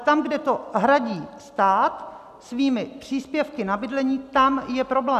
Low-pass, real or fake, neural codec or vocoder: 14.4 kHz; real; none